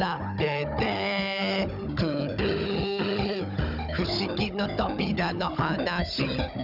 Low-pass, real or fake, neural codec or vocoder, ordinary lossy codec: 5.4 kHz; fake; codec, 16 kHz, 16 kbps, FunCodec, trained on Chinese and English, 50 frames a second; none